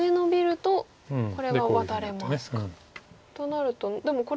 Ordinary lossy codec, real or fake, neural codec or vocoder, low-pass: none; real; none; none